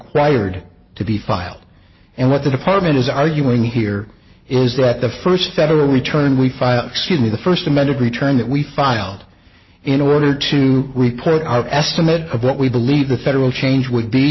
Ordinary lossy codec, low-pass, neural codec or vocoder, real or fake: MP3, 24 kbps; 7.2 kHz; none; real